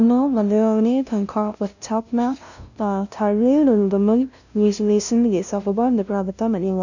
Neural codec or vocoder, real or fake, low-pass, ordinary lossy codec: codec, 16 kHz, 0.5 kbps, FunCodec, trained on LibriTTS, 25 frames a second; fake; 7.2 kHz; none